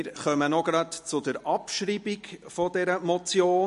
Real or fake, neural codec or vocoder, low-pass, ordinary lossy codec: real; none; 14.4 kHz; MP3, 48 kbps